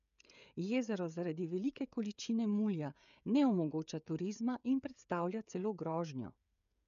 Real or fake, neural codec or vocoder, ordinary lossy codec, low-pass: fake; codec, 16 kHz, 16 kbps, FreqCodec, smaller model; none; 7.2 kHz